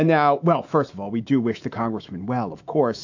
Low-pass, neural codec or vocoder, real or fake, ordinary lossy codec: 7.2 kHz; autoencoder, 48 kHz, 128 numbers a frame, DAC-VAE, trained on Japanese speech; fake; AAC, 48 kbps